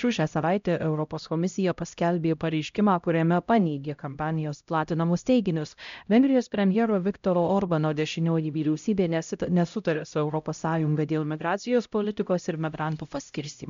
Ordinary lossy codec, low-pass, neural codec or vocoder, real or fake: MP3, 64 kbps; 7.2 kHz; codec, 16 kHz, 0.5 kbps, X-Codec, HuBERT features, trained on LibriSpeech; fake